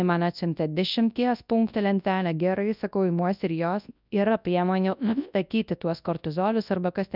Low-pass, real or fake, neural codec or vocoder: 5.4 kHz; fake; codec, 24 kHz, 0.9 kbps, WavTokenizer, large speech release